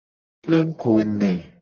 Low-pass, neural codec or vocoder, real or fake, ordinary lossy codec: 7.2 kHz; codec, 44.1 kHz, 1.7 kbps, Pupu-Codec; fake; Opus, 24 kbps